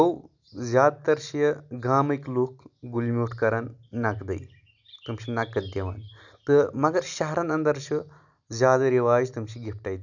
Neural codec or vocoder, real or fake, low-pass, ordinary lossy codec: none; real; 7.2 kHz; none